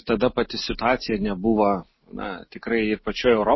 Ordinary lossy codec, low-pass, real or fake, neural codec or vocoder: MP3, 24 kbps; 7.2 kHz; real; none